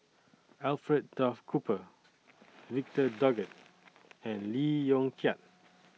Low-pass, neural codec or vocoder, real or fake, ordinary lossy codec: none; none; real; none